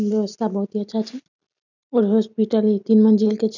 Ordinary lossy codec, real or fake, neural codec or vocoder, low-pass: none; real; none; 7.2 kHz